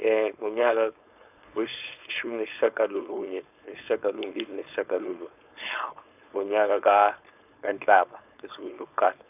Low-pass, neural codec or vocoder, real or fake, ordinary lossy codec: 3.6 kHz; codec, 16 kHz, 4.8 kbps, FACodec; fake; none